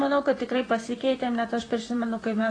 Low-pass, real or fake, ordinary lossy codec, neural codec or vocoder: 9.9 kHz; fake; AAC, 32 kbps; vocoder, 44.1 kHz, 128 mel bands, Pupu-Vocoder